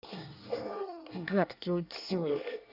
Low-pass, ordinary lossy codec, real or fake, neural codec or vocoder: 5.4 kHz; none; fake; codec, 24 kHz, 1 kbps, SNAC